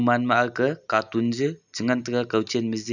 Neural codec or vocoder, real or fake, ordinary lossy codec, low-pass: none; real; none; 7.2 kHz